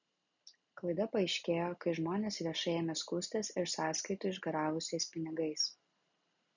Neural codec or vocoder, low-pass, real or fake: none; 7.2 kHz; real